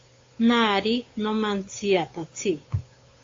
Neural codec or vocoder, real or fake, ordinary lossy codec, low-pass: codec, 16 kHz, 8 kbps, FunCodec, trained on Chinese and English, 25 frames a second; fake; AAC, 32 kbps; 7.2 kHz